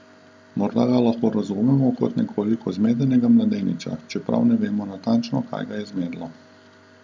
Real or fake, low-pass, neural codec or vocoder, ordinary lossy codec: real; none; none; none